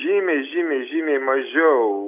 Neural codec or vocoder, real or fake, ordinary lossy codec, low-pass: none; real; AAC, 32 kbps; 3.6 kHz